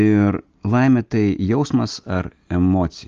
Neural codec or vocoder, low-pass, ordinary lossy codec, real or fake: none; 7.2 kHz; Opus, 32 kbps; real